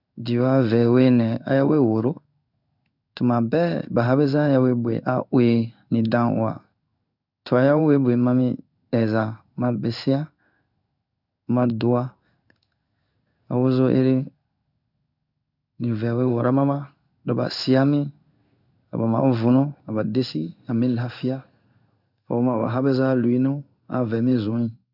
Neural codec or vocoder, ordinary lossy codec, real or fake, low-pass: codec, 16 kHz in and 24 kHz out, 1 kbps, XY-Tokenizer; none; fake; 5.4 kHz